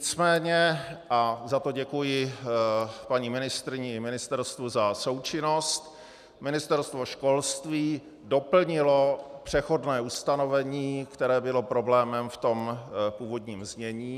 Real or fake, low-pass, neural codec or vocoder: real; 14.4 kHz; none